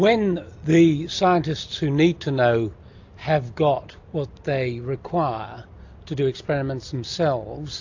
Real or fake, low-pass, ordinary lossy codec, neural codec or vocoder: real; 7.2 kHz; AAC, 48 kbps; none